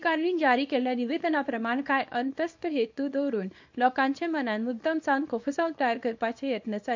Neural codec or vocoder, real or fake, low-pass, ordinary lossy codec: codec, 24 kHz, 0.9 kbps, WavTokenizer, small release; fake; 7.2 kHz; MP3, 48 kbps